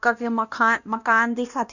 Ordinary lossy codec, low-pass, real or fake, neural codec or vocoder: none; 7.2 kHz; fake; codec, 16 kHz, 1 kbps, X-Codec, WavLM features, trained on Multilingual LibriSpeech